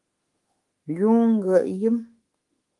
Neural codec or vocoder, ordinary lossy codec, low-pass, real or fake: autoencoder, 48 kHz, 32 numbers a frame, DAC-VAE, trained on Japanese speech; Opus, 24 kbps; 10.8 kHz; fake